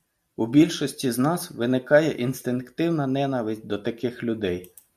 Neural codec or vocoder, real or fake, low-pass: none; real; 14.4 kHz